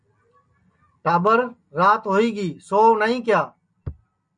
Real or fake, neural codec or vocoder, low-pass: real; none; 9.9 kHz